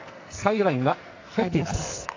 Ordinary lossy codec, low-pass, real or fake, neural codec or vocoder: AAC, 48 kbps; 7.2 kHz; fake; codec, 44.1 kHz, 2.6 kbps, SNAC